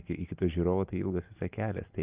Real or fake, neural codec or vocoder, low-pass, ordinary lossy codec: real; none; 3.6 kHz; Opus, 24 kbps